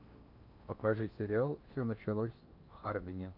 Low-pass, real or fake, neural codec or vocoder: 5.4 kHz; fake; codec, 16 kHz in and 24 kHz out, 0.8 kbps, FocalCodec, streaming, 65536 codes